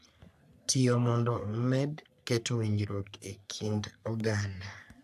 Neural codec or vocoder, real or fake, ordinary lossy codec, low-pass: codec, 44.1 kHz, 3.4 kbps, Pupu-Codec; fake; AAC, 96 kbps; 14.4 kHz